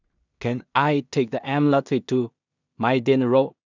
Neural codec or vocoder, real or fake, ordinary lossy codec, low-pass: codec, 16 kHz in and 24 kHz out, 0.4 kbps, LongCat-Audio-Codec, two codebook decoder; fake; none; 7.2 kHz